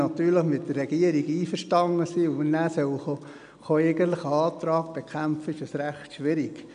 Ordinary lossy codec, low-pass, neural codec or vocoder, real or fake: none; 9.9 kHz; none; real